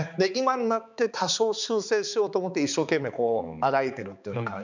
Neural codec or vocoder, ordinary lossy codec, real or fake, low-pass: codec, 16 kHz, 4 kbps, X-Codec, HuBERT features, trained on balanced general audio; none; fake; 7.2 kHz